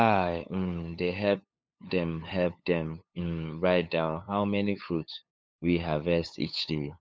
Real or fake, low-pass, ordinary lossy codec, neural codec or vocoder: fake; none; none; codec, 16 kHz, 8 kbps, FunCodec, trained on LibriTTS, 25 frames a second